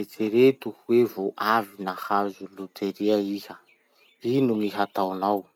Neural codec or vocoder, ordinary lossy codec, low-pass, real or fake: none; none; 19.8 kHz; real